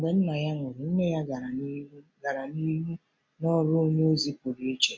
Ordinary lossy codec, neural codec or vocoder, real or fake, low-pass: none; none; real; none